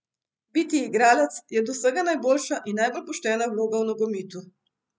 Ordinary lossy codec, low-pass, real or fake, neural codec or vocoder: none; none; real; none